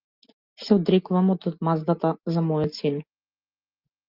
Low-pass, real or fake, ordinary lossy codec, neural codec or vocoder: 5.4 kHz; real; Opus, 32 kbps; none